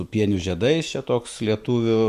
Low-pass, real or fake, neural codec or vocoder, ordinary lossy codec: 14.4 kHz; fake; autoencoder, 48 kHz, 128 numbers a frame, DAC-VAE, trained on Japanese speech; Opus, 64 kbps